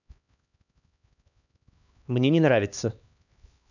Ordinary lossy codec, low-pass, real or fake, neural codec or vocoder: none; 7.2 kHz; fake; codec, 16 kHz, 2 kbps, X-Codec, HuBERT features, trained on LibriSpeech